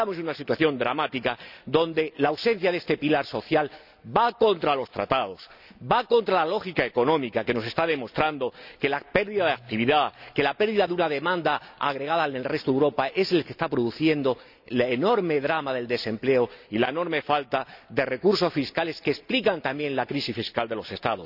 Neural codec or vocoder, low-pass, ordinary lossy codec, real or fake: none; 5.4 kHz; none; real